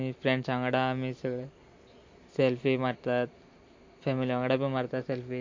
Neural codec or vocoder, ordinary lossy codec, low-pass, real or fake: none; MP3, 48 kbps; 7.2 kHz; real